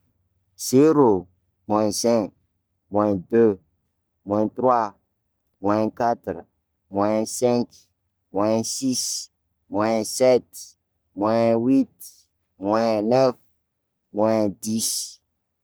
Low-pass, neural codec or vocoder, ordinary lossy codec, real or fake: none; codec, 44.1 kHz, 3.4 kbps, Pupu-Codec; none; fake